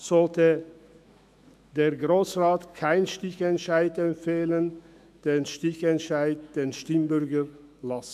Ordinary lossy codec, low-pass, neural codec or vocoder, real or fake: none; 14.4 kHz; autoencoder, 48 kHz, 128 numbers a frame, DAC-VAE, trained on Japanese speech; fake